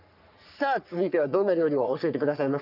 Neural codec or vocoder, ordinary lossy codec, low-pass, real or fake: codec, 44.1 kHz, 3.4 kbps, Pupu-Codec; none; 5.4 kHz; fake